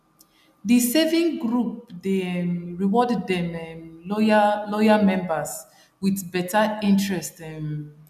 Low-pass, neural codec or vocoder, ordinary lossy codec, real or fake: 14.4 kHz; none; none; real